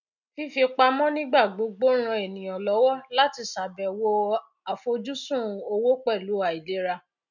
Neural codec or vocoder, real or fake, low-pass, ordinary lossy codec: none; real; 7.2 kHz; none